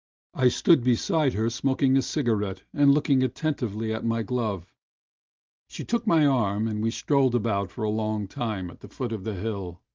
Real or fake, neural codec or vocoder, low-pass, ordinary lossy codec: real; none; 7.2 kHz; Opus, 32 kbps